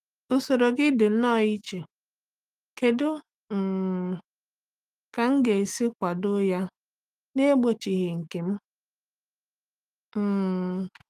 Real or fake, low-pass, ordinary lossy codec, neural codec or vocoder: fake; 14.4 kHz; Opus, 32 kbps; autoencoder, 48 kHz, 128 numbers a frame, DAC-VAE, trained on Japanese speech